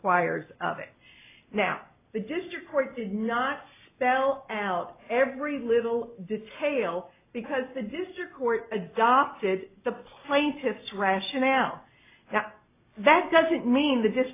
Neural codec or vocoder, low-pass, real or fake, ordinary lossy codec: none; 3.6 kHz; real; AAC, 24 kbps